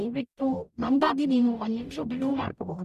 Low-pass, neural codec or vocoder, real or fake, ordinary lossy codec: 14.4 kHz; codec, 44.1 kHz, 0.9 kbps, DAC; fake; none